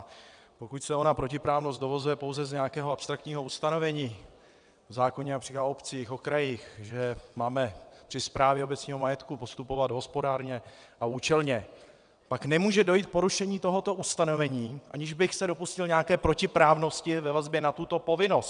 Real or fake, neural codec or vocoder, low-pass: fake; vocoder, 22.05 kHz, 80 mel bands, WaveNeXt; 9.9 kHz